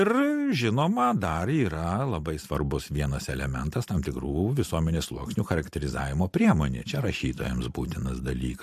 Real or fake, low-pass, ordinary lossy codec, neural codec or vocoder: real; 14.4 kHz; MP3, 64 kbps; none